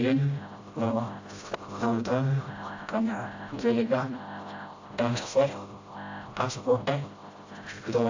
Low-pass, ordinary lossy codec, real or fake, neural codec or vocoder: 7.2 kHz; AAC, 48 kbps; fake; codec, 16 kHz, 0.5 kbps, FreqCodec, smaller model